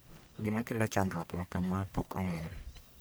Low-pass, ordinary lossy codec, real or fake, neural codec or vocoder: none; none; fake; codec, 44.1 kHz, 1.7 kbps, Pupu-Codec